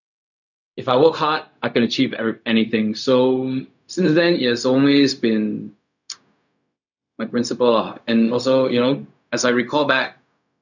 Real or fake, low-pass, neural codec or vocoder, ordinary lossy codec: fake; 7.2 kHz; codec, 16 kHz, 0.4 kbps, LongCat-Audio-Codec; none